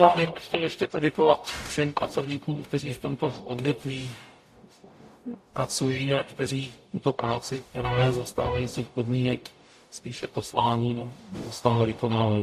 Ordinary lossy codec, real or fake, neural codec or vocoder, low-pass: AAC, 64 kbps; fake; codec, 44.1 kHz, 0.9 kbps, DAC; 14.4 kHz